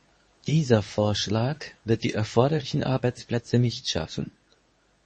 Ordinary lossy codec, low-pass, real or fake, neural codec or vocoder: MP3, 32 kbps; 10.8 kHz; fake; codec, 24 kHz, 0.9 kbps, WavTokenizer, medium speech release version 2